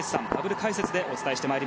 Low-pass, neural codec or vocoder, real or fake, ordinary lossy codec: none; none; real; none